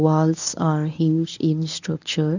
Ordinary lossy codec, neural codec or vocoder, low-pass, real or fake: none; codec, 24 kHz, 0.9 kbps, WavTokenizer, medium speech release version 1; 7.2 kHz; fake